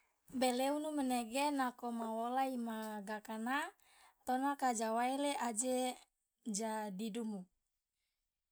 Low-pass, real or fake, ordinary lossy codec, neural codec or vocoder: none; real; none; none